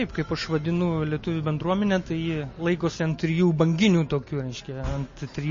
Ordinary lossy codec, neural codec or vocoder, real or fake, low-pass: MP3, 32 kbps; none; real; 7.2 kHz